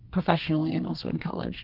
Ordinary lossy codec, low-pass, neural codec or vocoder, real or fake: Opus, 32 kbps; 5.4 kHz; codec, 32 kHz, 1.9 kbps, SNAC; fake